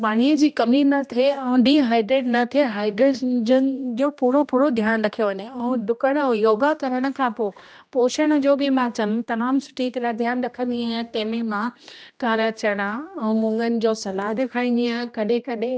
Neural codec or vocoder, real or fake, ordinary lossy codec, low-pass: codec, 16 kHz, 1 kbps, X-Codec, HuBERT features, trained on general audio; fake; none; none